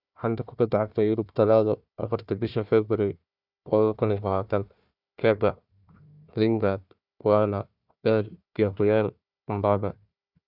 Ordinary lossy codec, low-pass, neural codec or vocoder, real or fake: none; 5.4 kHz; codec, 16 kHz, 1 kbps, FunCodec, trained on Chinese and English, 50 frames a second; fake